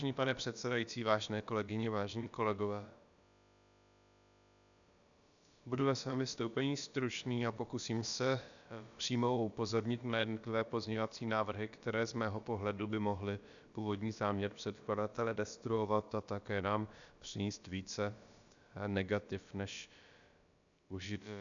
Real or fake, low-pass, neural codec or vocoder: fake; 7.2 kHz; codec, 16 kHz, about 1 kbps, DyCAST, with the encoder's durations